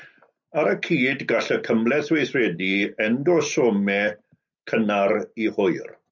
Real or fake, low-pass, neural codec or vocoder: real; 7.2 kHz; none